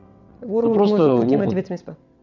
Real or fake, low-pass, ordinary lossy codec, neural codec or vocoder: fake; 7.2 kHz; none; vocoder, 22.05 kHz, 80 mel bands, WaveNeXt